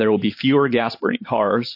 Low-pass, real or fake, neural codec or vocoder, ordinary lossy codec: 5.4 kHz; fake; vocoder, 44.1 kHz, 128 mel bands every 512 samples, BigVGAN v2; MP3, 32 kbps